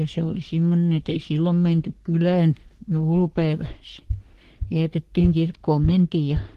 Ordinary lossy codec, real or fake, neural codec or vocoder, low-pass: Opus, 16 kbps; fake; codec, 44.1 kHz, 3.4 kbps, Pupu-Codec; 14.4 kHz